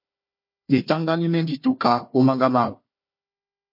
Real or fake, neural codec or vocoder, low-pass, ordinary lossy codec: fake; codec, 16 kHz, 1 kbps, FunCodec, trained on Chinese and English, 50 frames a second; 5.4 kHz; MP3, 32 kbps